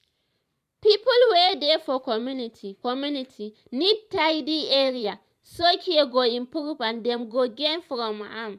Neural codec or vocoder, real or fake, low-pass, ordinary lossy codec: vocoder, 44.1 kHz, 128 mel bands every 512 samples, BigVGAN v2; fake; 14.4 kHz; none